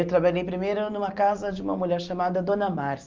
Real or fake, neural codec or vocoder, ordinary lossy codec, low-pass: real; none; Opus, 24 kbps; 7.2 kHz